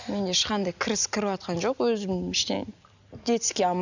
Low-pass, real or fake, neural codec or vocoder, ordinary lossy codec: 7.2 kHz; real; none; none